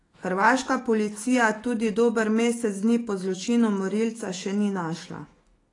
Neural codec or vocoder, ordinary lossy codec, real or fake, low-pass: autoencoder, 48 kHz, 128 numbers a frame, DAC-VAE, trained on Japanese speech; AAC, 32 kbps; fake; 10.8 kHz